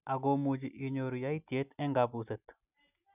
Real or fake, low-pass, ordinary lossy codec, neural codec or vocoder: real; 3.6 kHz; none; none